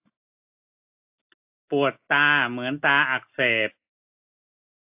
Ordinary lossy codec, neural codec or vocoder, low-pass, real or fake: AAC, 32 kbps; none; 3.6 kHz; real